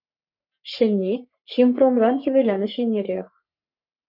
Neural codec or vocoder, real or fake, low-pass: codec, 44.1 kHz, 3.4 kbps, Pupu-Codec; fake; 5.4 kHz